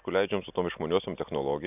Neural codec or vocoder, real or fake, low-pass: none; real; 3.6 kHz